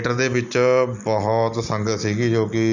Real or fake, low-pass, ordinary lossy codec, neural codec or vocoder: real; 7.2 kHz; none; none